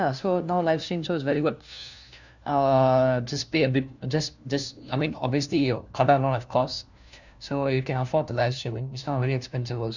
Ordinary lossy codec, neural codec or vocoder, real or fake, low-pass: none; codec, 16 kHz, 1 kbps, FunCodec, trained on LibriTTS, 50 frames a second; fake; 7.2 kHz